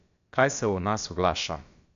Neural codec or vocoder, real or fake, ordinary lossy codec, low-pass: codec, 16 kHz, about 1 kbps, DyCAST, with the encoder's durations; fake; MP3, 48 kbps; 7.2 kHz